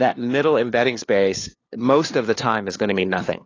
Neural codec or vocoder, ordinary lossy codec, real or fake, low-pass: codec, 16 kHz, 2 kbps, FunCodec, trained on LibriTTS, 25 frames a second; AAC, 32 kbps; fake; 7.2 kHz